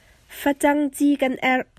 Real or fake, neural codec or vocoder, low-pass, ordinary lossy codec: real; none; 14.4 kHz; MP3, 96 kbps